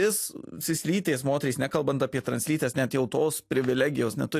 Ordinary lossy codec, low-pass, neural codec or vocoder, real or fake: AAC, 64 kbps; 14.4 kHz; none; real